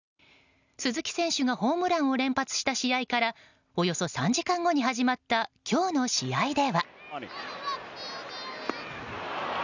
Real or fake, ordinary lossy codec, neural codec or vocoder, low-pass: real; none; none; 7.2 kHz